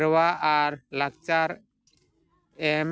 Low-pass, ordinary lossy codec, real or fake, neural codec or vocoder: none; none; real; none